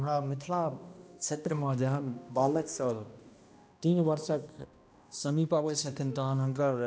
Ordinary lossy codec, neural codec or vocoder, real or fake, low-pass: none; codec, 16 kHz, 1 kbps, X-Codec, HuBERT features, trained on balanced general audio; fake; none